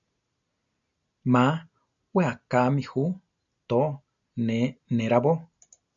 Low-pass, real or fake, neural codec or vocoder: 7.2 kHz; real; none